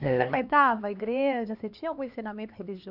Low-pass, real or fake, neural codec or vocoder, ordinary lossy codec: 5.4 kHz; fake; codec, 16 kHz, 2 kbps, X-Codec, HuBERT features, trained on LibriSpeech; none